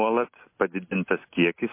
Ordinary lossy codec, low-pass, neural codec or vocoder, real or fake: MP3, 24 kbps; 3.6 kHz; none; real